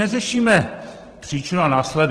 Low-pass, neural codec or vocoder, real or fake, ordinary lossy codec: 10.8 kHz; vocoder, 44.1 kHz, 128 mel bands every 512 samples, BigVGAN v2; fake; Opus, 16 kbps